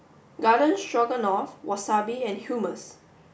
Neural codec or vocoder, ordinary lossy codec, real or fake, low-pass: none; none; real; none